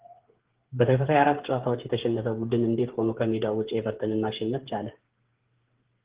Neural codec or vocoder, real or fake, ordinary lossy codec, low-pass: codec, 16 kHz, 8 kbps, FreqCodec, smaller model; fake; Opus, 16 kbps; 3.6 kHz